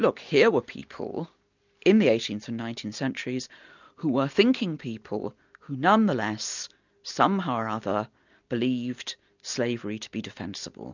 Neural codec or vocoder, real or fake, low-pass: none; real; 7.2 kHz